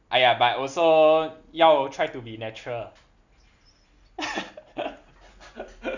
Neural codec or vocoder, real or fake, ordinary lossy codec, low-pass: none; real; none; 7.2 kHz